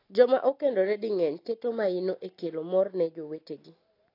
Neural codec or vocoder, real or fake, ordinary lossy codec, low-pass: none; real; AAC, 32 kbps; 5.4 kHz